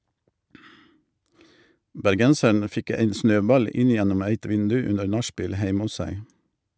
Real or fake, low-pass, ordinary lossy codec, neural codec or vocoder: real; none; none; none